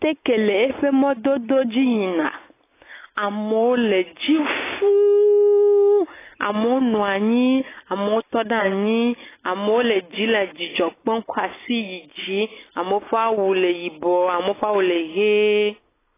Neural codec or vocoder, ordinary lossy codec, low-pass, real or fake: none; AAC, 16 kbps; 3.6 kHz; real